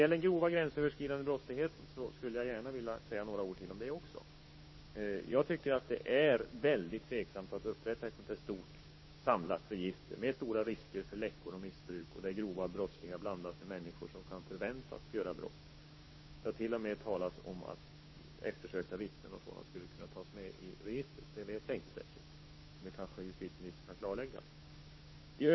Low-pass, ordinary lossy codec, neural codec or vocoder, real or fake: 7.2 kHz; MP3, 24 kbps; codec, 16 kHz, 6 kbps, DAC; fake